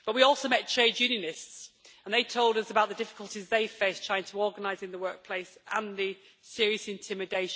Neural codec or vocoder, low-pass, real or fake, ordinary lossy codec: none; none; real; none